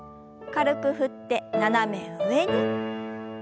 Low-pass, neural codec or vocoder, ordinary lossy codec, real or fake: none; none; none; real